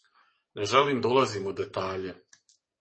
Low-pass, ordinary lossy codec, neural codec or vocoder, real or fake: 9.9 kHz; MP3, 32 kbps; codec, 44.1 kHz, 7.8 kbps, DAC; fake